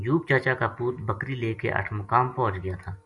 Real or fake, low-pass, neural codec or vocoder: real; 10.8 kHz; none